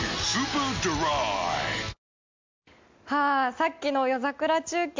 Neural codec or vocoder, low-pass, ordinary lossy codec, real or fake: none; 7.2 kHz; MP3, 64 kbps; real